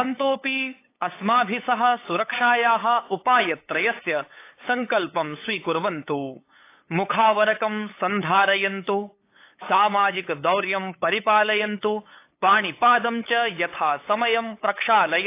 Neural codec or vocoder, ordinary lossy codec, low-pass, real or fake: codec, 16 kHz, 8 kbps, FunCodec, trained on LibriTTS, 25 frames a second; AAC, 24 kbps; 3.6 kHz; fake